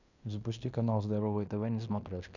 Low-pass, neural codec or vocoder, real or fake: 7.2 kHz; codec, 16 kHz in and 24 kHz out, 0.9 kbps, LongCat-Audio-Codec, fine tuned four codebook decoder; fake